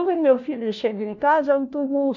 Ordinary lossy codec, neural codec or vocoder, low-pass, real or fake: none; codec, 16 kHz, 1 kbps, FunCodec, trained on LibriTTS, 50 frames a second; 7.2 kHz; fake